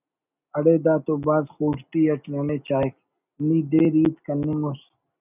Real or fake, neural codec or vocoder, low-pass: real; none; 3.6 kHz